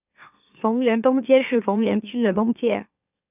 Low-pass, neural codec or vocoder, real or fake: 3.6 kHz; autoencoder, 44.1 kHz, a latent of 192 numbers a frame, MeloTTS; fake